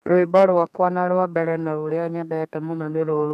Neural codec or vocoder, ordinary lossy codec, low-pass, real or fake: codec, 32 kHz, 1.9 kbps, SNAC; none; 14.4 kHz; fake